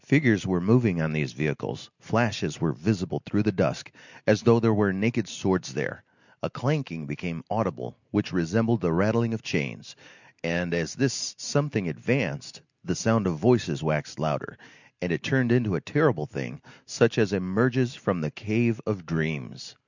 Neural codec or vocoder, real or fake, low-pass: none; real; 7.2 kHz